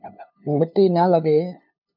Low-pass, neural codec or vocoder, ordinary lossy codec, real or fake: 5.4 kHz; codec, 16 kHz, 2 kbps, FunCodec, trained on LibriTTS, 25 frames a second; MP3, 48 kbps; fake